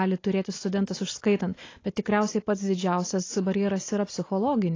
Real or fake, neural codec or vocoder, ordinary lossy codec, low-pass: real; none; AAC, 32 kbps; 7.2 kHz